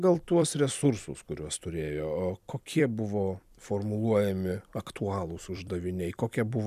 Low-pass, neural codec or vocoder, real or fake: 14.4 kHz; vocoder, 44.1 kHz, 128 mel bands every 256 samples, BigVGAN v2; fake